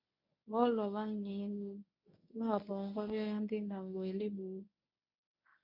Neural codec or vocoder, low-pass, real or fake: codec, 24 kHz, 0.9 kbps, WavTokenizer, medium speech release version 1; 5.4 kHz; fake